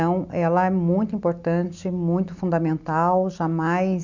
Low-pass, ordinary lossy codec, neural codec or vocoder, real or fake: 7.2 kHz; none; none; real